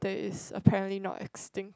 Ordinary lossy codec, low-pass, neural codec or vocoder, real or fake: none; none; none; real